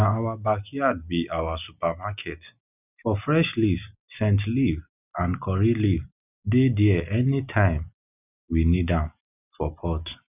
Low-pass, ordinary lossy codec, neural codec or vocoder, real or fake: 3.6 kHz; none; none; real